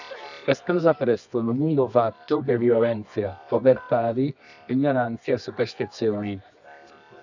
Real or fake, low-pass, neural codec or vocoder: fake; 7.2 kHz; codec, 24 kHz, 0.9 kbps, WavTokenizer, medium music audio release